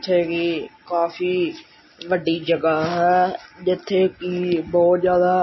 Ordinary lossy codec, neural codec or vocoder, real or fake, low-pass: MP3, 24 kbps; none; real; 7.2 kHz